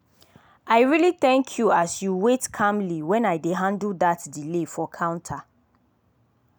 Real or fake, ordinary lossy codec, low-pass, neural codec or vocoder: real; none; none; none